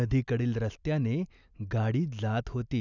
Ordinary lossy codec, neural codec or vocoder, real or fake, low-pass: none; none; real; 7.2 kHz